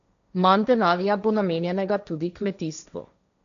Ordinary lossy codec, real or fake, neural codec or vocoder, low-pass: none; fake; codec, 16 kHz, 1.1 kbps, Voila-Tokenizer; 7.2 kHz